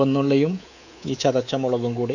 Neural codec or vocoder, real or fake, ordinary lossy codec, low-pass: codec, 24 kHz, 3.1 kbps, DualCodec; fake; none; 7.2 kHz